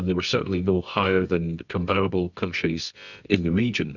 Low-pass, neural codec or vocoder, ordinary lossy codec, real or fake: 7.2 kHz; codec, 24 kHz, 0.9 kbps, WavTokenizer, medium music audio release; Opus, 64 kbps; fake